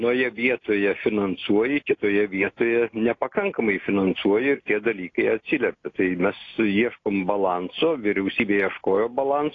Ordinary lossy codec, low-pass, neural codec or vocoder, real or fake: AAC, 32 kbps; 7.2 kHz; none; real